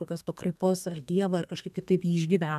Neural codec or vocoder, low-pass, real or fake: codec, 32 kHz, 1.9 kbps, SNAC; 14.4 kHz; fake